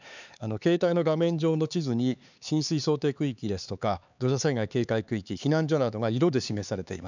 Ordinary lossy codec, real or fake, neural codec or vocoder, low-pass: none; fake; codec, 16 kHz, 4 kbps, X-Codec, HuBERT features, trained on LibriSpeech; 7.2 kHz